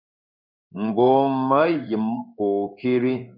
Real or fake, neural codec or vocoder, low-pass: fake; codec, 16 kHz in and 24 kHz out, 1 kbps, XY-Tokenizer; 5.4 kHz